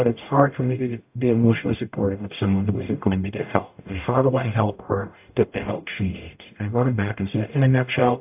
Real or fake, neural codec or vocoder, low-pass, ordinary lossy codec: fake; codec, 44.1 kHz, 0.9 kbps, DAC; 3.6 kHz; AAC, 32 kbps